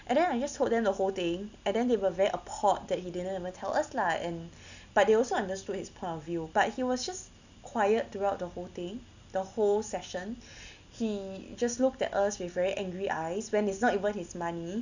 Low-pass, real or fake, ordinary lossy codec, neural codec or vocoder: 7.2 kHz; real; none; none